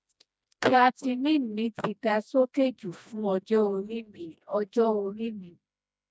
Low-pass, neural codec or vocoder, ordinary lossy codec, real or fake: none; codec, 16 kHz, 1 kbps, FreqCodec, smaller model; none; fake